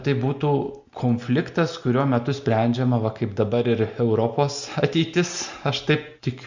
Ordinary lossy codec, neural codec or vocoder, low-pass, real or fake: Opus, 64 kbps; none; 7.2 kHz; real